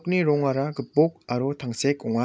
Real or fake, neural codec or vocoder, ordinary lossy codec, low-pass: real; none; none; none